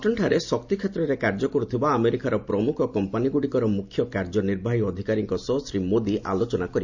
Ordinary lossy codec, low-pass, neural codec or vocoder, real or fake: Opus, 64 kbps; 7.2 kHz; none; real